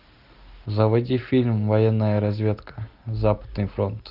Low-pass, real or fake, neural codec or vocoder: 5.4 kHz; real; none